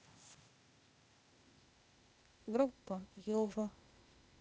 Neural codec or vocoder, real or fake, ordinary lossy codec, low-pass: codec, 16 kHz, 0.8 kbps, ZipCodec; fake; none; none